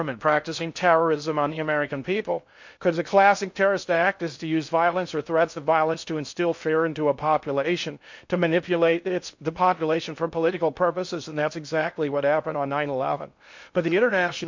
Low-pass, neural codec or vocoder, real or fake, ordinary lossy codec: 7.2 kHz; codec, 16 kHz in and 24 kHz out, 0.6 kbps, FocalCodec, streaming, 4096 codes; fake; MP3, 48 kbps